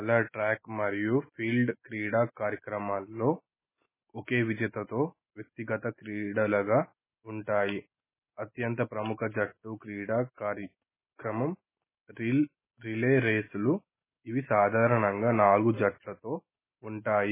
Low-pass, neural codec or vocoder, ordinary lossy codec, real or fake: 3.6 kHz; none; MP3, 16 kbps; real